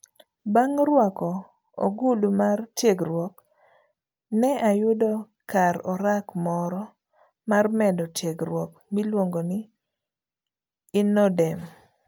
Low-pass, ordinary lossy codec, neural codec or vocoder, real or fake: none; none; none; real